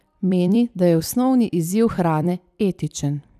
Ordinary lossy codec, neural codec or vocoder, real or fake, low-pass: none; vocoder, 44.1 kHz, 128 mel bands every 256 samples, BigVGAN v2; fake; 14.4 kHz